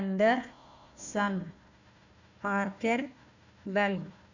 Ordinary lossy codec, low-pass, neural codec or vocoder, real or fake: none; 7.2 kHz; codec, 16 kHz, 1 kbps, FunCodec, trained on Chinese and English, 50 frames a second; fake